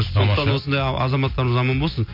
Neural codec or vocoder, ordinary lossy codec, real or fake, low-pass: none; MP3, 32 kbps; real; 5.4 kHz